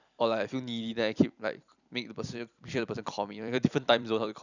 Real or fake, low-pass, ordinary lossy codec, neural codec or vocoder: fake; 7.2 kHz; none; vocoder, 44.1 kHz, 128 mel bands every 256 samples, BigVGAN v2